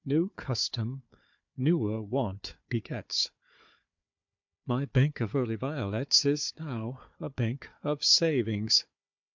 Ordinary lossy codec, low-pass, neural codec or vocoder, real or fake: MP3, 64 kbps; 7.2 kHz; codec, 16 kHz, 4 kbps, FunCodec, trained on Chinese and English, 50 frames a second; fake